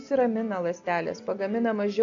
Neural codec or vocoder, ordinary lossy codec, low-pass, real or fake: none; AAC, 64 kbps; 7.2 kHz; real